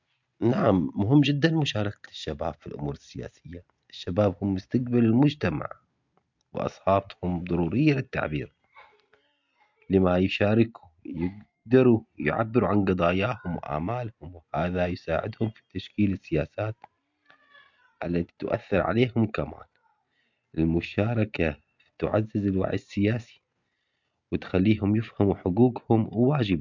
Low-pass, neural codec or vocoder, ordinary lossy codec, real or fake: 7.2 kHz; none; MP3, 64 kbps; real